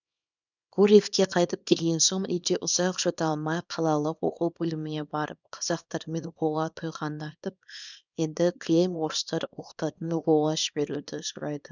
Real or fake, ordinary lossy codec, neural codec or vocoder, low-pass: fake; none; codec, 24 kHz, 0.9 kbps, WavTokenizer, small release; 7.2 kHz